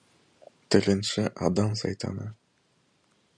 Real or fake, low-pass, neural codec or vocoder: fake; 9.9 kHz; vocoder, 44.1 kHz, 128 mel bands every 512 samples, BigVGAN v2